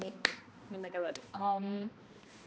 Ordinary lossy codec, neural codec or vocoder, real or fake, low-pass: none; codec, 16 kHz, 1 kbps, X-Codec, HuBERT features, trained on balanced general audio; fake; none